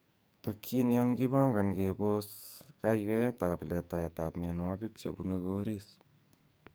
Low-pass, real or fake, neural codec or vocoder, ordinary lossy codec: none; fake; codec, 44.1 kHz, 2.6 kbps, SNAC; none